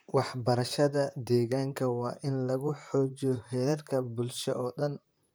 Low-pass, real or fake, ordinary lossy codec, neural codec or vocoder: none; fake; none; vocoder, 44.1 kHz, 128 mel bands, Pupu-Vocoder